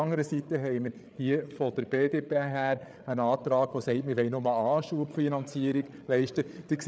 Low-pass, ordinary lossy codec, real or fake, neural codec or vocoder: none; none; fake; codec, 16 kHz, 8 kbps, FreqCodec, larger model